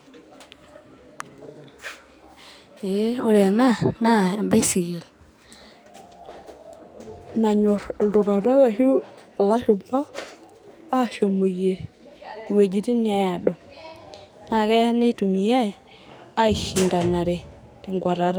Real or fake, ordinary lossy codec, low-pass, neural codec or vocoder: fake; none; none; codec, 44.1 kHz, 2.6 kbps, SNAC